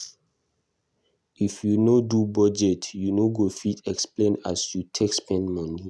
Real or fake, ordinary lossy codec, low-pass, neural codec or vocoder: real; none; none; none